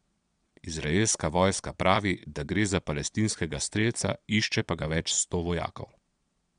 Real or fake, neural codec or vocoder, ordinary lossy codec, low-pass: fake; vocoder, 22.05 kHz, 80 mel bands, Vocos; MP3, 96 kbps; 9.9 kHz